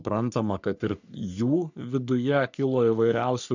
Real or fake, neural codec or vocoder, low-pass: fake; codec, 44.1 kHz, 3.4 kbps, Pupu-Codec; 7.2 kHz